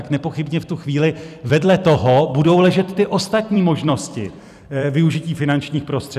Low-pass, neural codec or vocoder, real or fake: 14.4 kHz; vocoder, 44.1 kHz, 128 mel bands every 256 samples, BigVGAN v2; fake